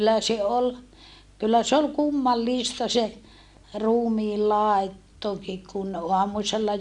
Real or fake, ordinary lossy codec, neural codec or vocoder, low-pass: real; Opus, 64 kbps; none; 10.8 kHz